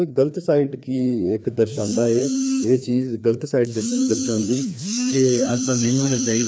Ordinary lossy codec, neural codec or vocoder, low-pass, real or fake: none; codec, 16 kHz, 2 kbps, FreqCodec, larger model; none; fake